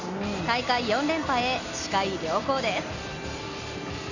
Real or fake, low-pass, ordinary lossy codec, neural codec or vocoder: real; 7.2 kHz; none; none